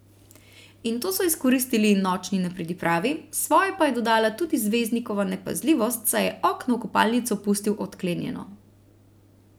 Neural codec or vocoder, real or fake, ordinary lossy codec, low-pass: none; real; none; none